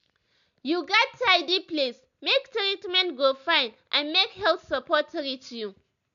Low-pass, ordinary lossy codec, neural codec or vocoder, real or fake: 7.2 kHz; none; none; real